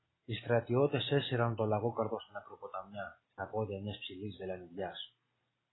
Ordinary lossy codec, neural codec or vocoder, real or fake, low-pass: AAC, 16 kbps; none; real; 7.2 kHz